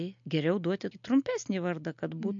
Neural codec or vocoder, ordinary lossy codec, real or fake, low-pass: none; MP3, 48 kbps; real; 7.2 kHz